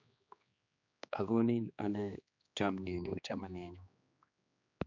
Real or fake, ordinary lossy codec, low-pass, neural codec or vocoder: fake; none; 7.2 kHz; codec, 16 kHz, 2 kbps, X-Codec, HuBERT features, trained on general audio